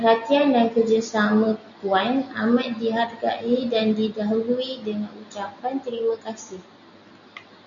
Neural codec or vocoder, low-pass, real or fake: none; 7.2 kHz; real